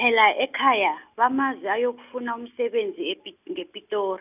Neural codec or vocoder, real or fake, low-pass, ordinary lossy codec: none; real; 3.6 kHz; none